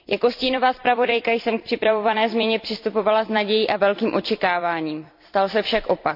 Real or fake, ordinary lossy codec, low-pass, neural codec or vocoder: fake; MP3, 48 kbps; 5.4 kHz; vocoder, 44.1 kHz, 128 mel bands every 256 samples, BigVGAN v2